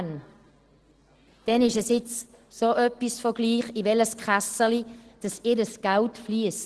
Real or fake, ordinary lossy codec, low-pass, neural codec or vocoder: real; Opus, 16 kbps; 10.8 kHz; none